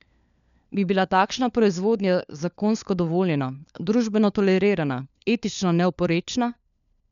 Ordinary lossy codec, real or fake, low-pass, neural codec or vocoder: none; fake; 7.2 kHz; codec, 16 kHz, 4 kbps, FunCodec, trained on LibriTTS, 50 frames a second